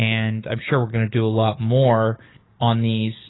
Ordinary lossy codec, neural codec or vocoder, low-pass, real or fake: AAC, 16 kbps; codec, 44.1 kHz, 7.8 kbps, DAC; 7.2 kHz; fake